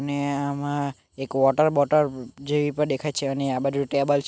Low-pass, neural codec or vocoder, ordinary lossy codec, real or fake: none; none; none; real